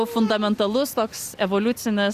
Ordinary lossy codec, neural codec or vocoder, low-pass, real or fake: Opus, 64 kbps; none; 14.4 kHz; real